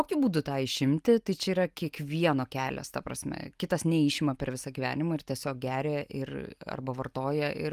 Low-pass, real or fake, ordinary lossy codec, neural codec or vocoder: 14.4 kHz; real; Opus, 32 kbps; none